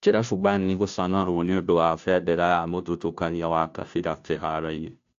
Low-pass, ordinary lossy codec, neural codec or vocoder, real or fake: 7.2 kHz; AAC, 64 kbps; codec, 16 kHz, 0.5 kbps, FunCodec, trained on Chinese and English, 25 frames a second; fake